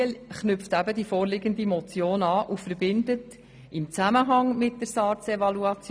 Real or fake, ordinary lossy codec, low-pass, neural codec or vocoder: real; none; none; none